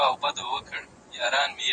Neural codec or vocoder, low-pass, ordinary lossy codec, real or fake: vocoder, 24 kHz, 100 mel bands, Vocos; 9.9 kHz; Opus, 64 kbps; fake